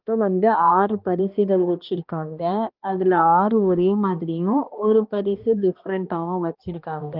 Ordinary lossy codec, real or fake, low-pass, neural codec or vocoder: Opus, 24 kbps; fake; 5.4 kHz; codec, 16 kHz, 1 kbps, X-Codec, HuBERT features, trained on balanced general audio